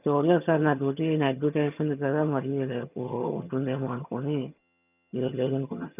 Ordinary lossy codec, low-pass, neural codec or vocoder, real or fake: none; 3.6 kHz; vocoder, 22.05 kHz, 80 mel bands, HiFi-GAN; fake